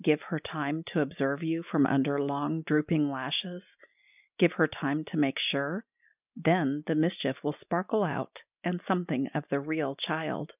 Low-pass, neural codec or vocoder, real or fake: 3.6 kHz; none; real